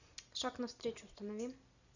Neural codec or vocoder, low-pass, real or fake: none; 7.2 kHz; real